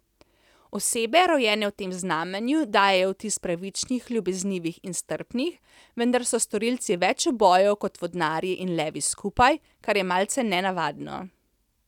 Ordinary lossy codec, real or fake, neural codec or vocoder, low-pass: none; real; none; 19.8 kHz